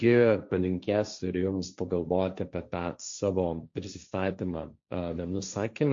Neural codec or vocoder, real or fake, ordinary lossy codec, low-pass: codec, 16 kHz, 1.1 kbps, Voila-Tokenizer; fake; MP3, 48 kbps; 7.2 kHz